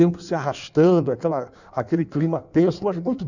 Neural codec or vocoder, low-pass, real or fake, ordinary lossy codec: codec, 16 kHz in and 24 kHz out, 1.1 kbps, FireRedTTS-2 codec; 7.2 kHz; fake; none